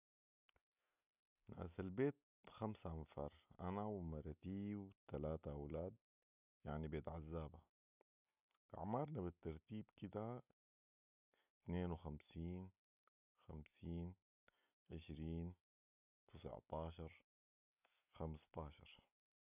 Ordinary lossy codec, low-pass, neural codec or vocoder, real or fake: none; 3.6 kHz; none; real